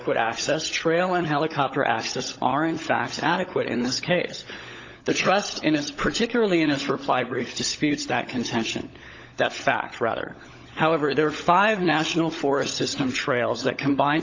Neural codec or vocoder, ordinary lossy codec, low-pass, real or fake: codec, 16 kHz, 16 kbps, FunCodec, trained on LibriTTS, 50 frames a second; AAC, 48 kbps; 7.2 kHz; fake